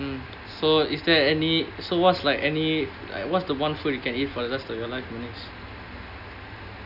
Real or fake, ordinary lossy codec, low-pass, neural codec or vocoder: real; none; 5.4 kHz; none